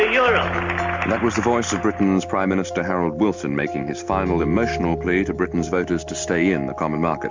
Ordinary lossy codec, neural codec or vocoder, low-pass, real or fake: MP3, 64 kbps; none; 7.2 kHz; real